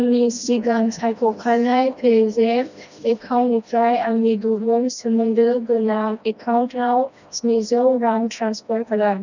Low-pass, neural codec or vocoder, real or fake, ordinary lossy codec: 7.2 kHz; codec, 16 kHz, 1 kbps, FreqCodec, smaller model; fake; none